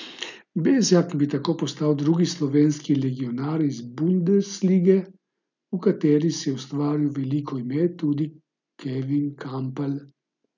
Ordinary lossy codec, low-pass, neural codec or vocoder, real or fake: none; 7.2 kHz; none; real